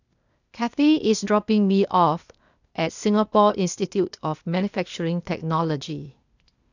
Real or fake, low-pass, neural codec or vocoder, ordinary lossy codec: fake; 7.2 kHz; codec, 16 kHz, 0.8 kbps, ZipCodec; none